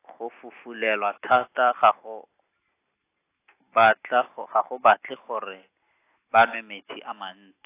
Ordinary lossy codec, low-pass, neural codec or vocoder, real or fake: AAC, 24 kbps; 3.6 kHz; none; real